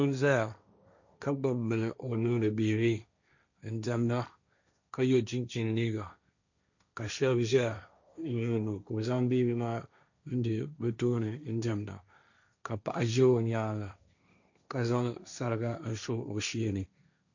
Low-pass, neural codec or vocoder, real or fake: 7.2 kHz; codec, 16 kHz, 1.1 kbps, Voila-Tokenizer; fake